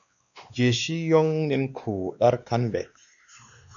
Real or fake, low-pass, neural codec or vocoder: fake; 7.2 kHz; codec, 16 kHz, 2 kbps, X-Codec, WavLM features, trained on Multilingual LibriSpeech